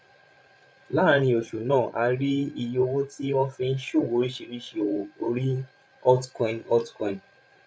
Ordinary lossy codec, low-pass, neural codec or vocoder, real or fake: none; none; codec, 16 kHz, 16 kbps, FreqCodec, larger model; fake